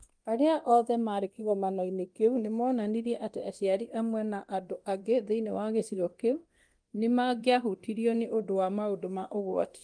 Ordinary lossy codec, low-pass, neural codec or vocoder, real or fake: Opus, 24 kbps; 9.9 kHz; codec, 24 kHz, 0.9 kbps, DualCodec; fake